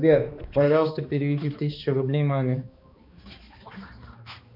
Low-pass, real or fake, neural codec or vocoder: 5.4 kHz; fake; codec, 16 kHz, 2 kbps, X-Codec, HuBERT features, trained on balanced general audio